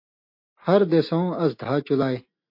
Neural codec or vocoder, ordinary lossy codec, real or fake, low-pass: none; MP3, 32 kbps; real; 5.4 kHz